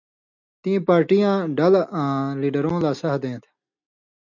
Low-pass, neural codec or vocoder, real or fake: 7.2 kHz; none; real